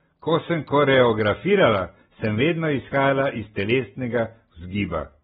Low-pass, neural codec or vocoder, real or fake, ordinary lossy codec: 19.8 kHz; none; real; AAC, 16 kbps